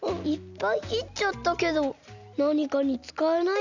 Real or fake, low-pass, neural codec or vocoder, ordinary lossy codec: real; 7.2 kHz; none; none